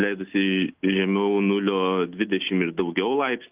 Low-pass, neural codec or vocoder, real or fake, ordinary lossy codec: 3.6 kHz; none; real; Opus, 24 kbps